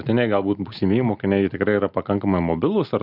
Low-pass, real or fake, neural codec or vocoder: 5.4 kHz; real; none